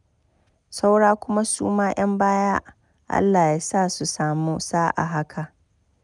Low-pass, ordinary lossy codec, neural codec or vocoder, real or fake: 10.8 kHz; none; none; real